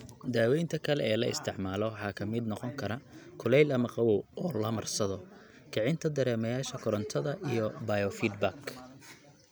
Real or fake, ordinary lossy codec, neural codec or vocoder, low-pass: real; none; none; none